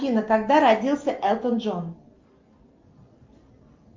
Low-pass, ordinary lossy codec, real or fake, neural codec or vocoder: 7.2 kHz; Opus, 24 kbps; real; none